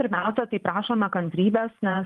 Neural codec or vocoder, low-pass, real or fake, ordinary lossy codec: vocoder, 44.1 kHz, 128 mel bands every 512 samples, BigVGAN v2; 14.4 kHz; fake; Opus, 32 kbps